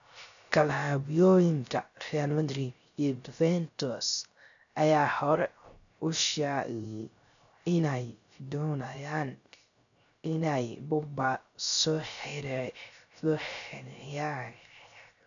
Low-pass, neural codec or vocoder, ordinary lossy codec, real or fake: 7.2 kHz; codec, 16 kHz, 0.3 kbps, FocalCodec; none; fake